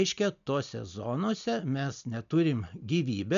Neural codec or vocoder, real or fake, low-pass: none; real; 7.2 kHz